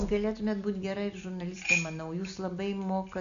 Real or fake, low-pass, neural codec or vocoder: real; 7.2 kHz; none